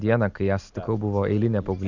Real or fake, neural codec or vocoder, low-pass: real; none; 7.2 kHz